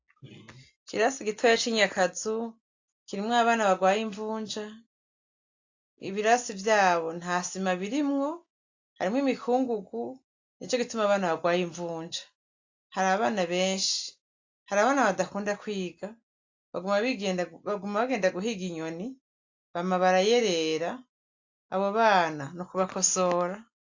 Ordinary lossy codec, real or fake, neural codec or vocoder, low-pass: AAC, 48 kbps; real; none; 7.2 kHz